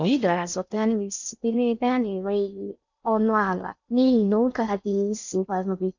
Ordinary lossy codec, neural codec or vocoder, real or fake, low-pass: none; codec, 16 kHz in and 24 kHz out, 0.6 kbps, FocalCodec, streaming, 4096 codes; fake; 7.2 kHz